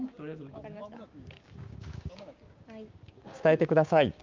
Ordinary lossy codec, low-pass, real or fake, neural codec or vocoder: Opus, 24 kbps; 7.2 kHz; fake; codec, 16 kHz, 6 kbps, DAC